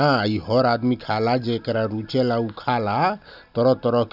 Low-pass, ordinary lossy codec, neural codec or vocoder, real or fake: 5.4 kHz; none; none; real